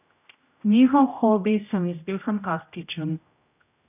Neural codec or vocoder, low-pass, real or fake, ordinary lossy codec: codec, 16 kHz, 0.5 kbps, X-Codec, HuBERT features, trained on general audio; 3.6 kHz; fake; none